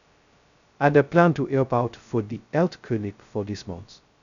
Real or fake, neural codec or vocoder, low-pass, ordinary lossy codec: fake; codec, 16 kHz, 0.2 kbps, FocalCodec; 7.2 kHz; none